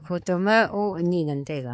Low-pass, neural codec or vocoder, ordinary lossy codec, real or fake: none; codec, 16 kHz, 4 kbps, X-Codec, HuBERT features, trained on balanced general audio; none; fake